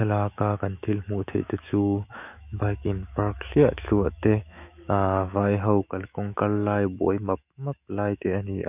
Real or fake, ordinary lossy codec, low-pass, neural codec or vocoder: fake; none; 3.6 kHz; autoencoder, 48 kHz, 128 numbers a frame, DAC-VAE, trained on Japanese speech